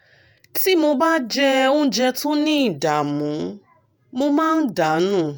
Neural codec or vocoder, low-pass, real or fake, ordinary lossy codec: vocoder, 48 kHz, 128 mel bands, Vocos; none; fake; none